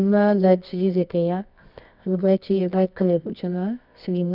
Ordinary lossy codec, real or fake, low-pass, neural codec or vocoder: none; fake; 5.4 kHz; codec, 24 kHz, 0.9 kbps, WavTokenizer, medium music audio release